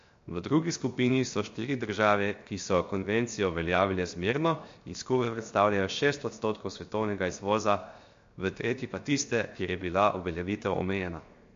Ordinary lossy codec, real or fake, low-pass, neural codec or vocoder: MP3, 48 kbps; fake; 7.2 kHz; codec, 16 kHz, 0.7 kbps, FocalCodec